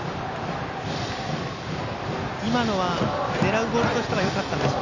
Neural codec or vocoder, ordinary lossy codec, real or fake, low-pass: none; none; real; 7.2 kHz